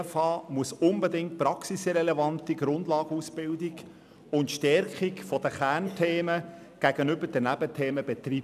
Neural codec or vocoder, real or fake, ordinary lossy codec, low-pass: none; real; none; 14.4 kHz